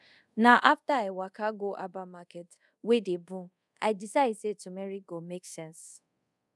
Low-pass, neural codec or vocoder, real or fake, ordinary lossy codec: none; codec, 24 kHz, 0.5 kbps, DualCodec; fake; none